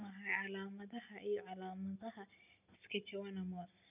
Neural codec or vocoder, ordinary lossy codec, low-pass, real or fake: none; none; 3.6 kHz; real